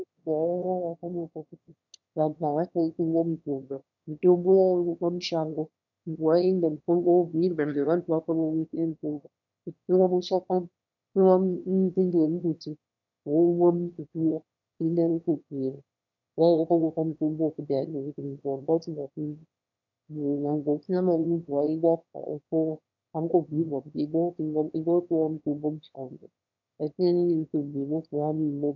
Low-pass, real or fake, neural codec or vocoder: 7.2 kHz; fake; autoencoder, 22.05 kHz, a latent of 192 numbers a frame, VITS, trained on one speaker